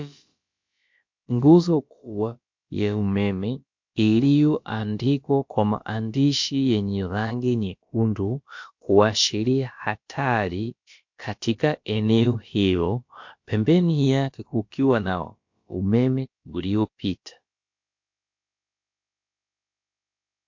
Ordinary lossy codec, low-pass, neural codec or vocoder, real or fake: MP3, 48 kbps; 7.2 kHz; codec, 16 kHz, about 1 kbps, DyCAST, with the encoder's durations; fake